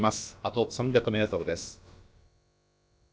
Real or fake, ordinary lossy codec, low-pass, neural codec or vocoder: fake; none; none; codec, 16 kHz, about 1 kbps, DyCAST, with the encoder's durations